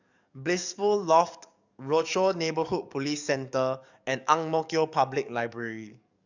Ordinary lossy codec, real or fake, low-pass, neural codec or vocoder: none; fake; 7.2 kHz; codec, 44.1 kHz, 7.8 kbps, DAC